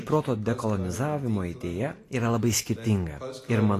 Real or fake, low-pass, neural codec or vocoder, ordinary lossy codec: real; 14.4 kHz; none; AAC, 48 kbps